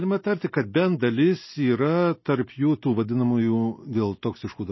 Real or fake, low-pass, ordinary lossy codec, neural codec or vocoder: real; 7.2 kHz; MP3, 24 kbps; none